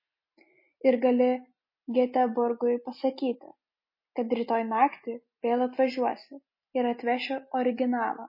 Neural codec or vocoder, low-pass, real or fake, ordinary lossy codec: none; 5.4 kHz; real; MP3, 24 kbps